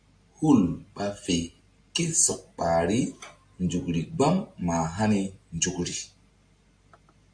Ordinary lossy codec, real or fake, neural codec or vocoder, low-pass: AAC, 64 kbps; real; none; 9.9 kHz